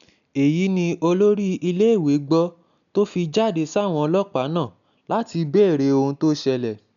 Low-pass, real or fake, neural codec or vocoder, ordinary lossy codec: 7.2 kHz; real; none; none